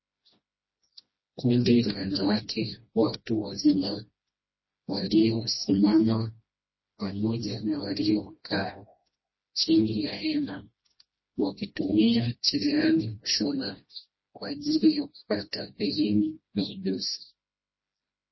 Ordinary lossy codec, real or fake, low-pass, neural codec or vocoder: MP3, 24 kbps; fake; 7.2 kHz; codec, 16 kHz, 1 kbps, FreqCodec, smaller model